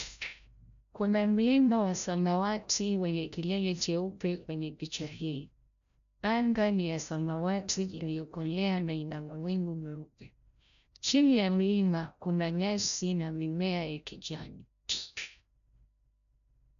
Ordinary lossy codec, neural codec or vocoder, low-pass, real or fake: none; codec, 16 kHz, 0.5 kbps, FreqCodec, larger model; 7.2 kHz; fake